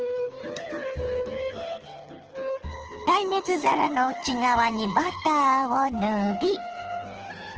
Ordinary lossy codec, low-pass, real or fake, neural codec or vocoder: Opus, 16 kbps; 7.2 kHz; fake; codec, 24 kHz, 6 kbps, HILCodec